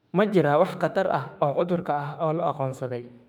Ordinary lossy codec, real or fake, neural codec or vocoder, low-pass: none; fake; autoencoder, 48 kHz, 32 numbers a frame, DAC-VAE, trained on Japanese speech; 19.8 kHz